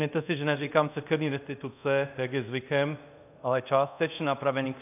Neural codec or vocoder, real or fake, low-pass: codec, 24 kHz, 0.5 kbps, DualCodec; fake; 3.6 kHz